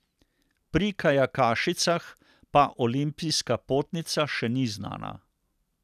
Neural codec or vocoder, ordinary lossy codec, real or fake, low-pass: none; none; real; 14.4 kHz